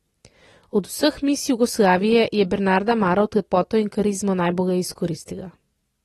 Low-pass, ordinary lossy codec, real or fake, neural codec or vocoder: 19.8 kHz; AAC, 32 kbps; real; none